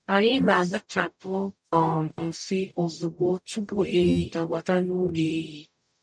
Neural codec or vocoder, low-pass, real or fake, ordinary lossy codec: codec, 44.1 kHz, 0.9 kbps, DAC; 9.9 kHz; fake; none